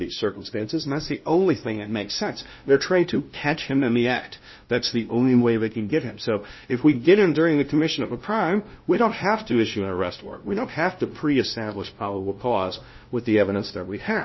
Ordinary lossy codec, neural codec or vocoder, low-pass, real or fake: MP3, 24 kbps; codec, 16 kHz, 0.5 kbps, FunCodec, trained on LibriTTS, 25 frames a second; 7.2 kHz; fake